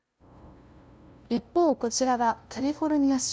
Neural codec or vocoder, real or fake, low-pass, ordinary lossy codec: codec, 16 kHz, 0.5 kbps, FunCodec, trained on LibriTTS, 25 frames a second; fake; none; none